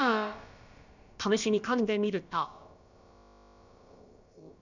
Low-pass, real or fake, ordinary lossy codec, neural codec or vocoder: 7.2 kHz; fake; none; codec, 16 kHz, about 1 kbps, DyCAST, with the encoder's durations